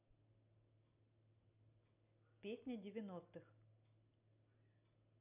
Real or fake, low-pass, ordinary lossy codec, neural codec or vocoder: real; 3.6 kHz; none; none